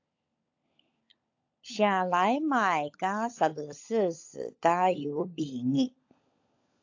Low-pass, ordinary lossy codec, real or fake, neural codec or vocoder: 7.2 kHz; AAC, 48 kbps; fake; codec, 16 kHz, 16 kbps, FunCodec, trained on LibriTTS, 50 frames a second